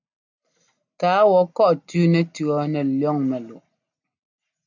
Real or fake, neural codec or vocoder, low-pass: real; none; 7.2 kHz